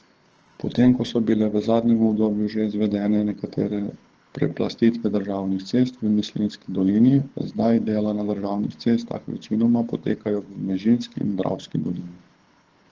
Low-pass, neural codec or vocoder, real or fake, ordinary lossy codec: 7.2 kHz; codec, 24 kHz, 6 kbps, HILCodec; fake; Opus, 16 kbps